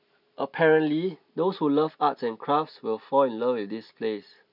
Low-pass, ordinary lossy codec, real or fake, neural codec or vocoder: 5.4 kHz; none; real; none